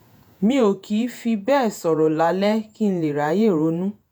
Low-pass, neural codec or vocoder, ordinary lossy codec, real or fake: none; vocoder, 48 kHz, 128 mel bands, Vocos; none; fake